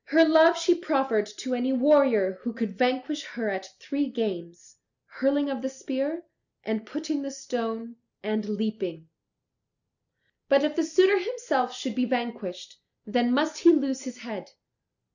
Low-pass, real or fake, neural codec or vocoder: 7.2 kHz; real; none